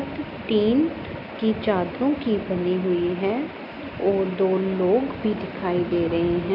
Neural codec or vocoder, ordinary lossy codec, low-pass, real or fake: none; none; 5.4 kHz; real